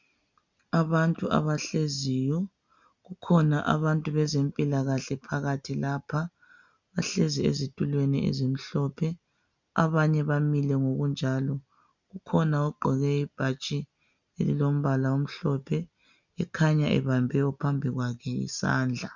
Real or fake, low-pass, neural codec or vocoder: real; 7.2 kHz; none